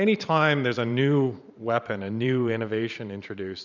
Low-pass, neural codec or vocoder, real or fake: 7.2 kHz; vocoder, 44.1 kHz, 128 mel bands every 512 samples, BigVGAN v2; fake